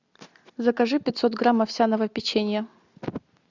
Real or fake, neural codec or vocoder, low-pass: real; none; 7.2 kHz